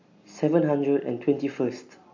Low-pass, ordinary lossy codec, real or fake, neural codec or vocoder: 7.2 kHz; AAC, 48 kbps; real; none